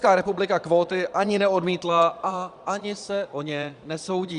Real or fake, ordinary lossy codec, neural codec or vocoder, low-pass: fake; Opus, 64 kbps; vocoder, 22.05 kHz, 80 mel bands, Vocos; 9.9 kHz